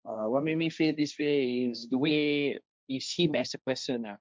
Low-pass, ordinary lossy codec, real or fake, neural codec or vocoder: none; none; fake; codec, 16 kHz, 1.1 kbps, Voila-Tokenizer